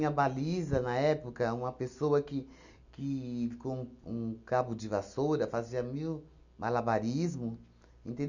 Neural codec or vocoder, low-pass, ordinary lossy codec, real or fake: none; 7.2 kHz; none; real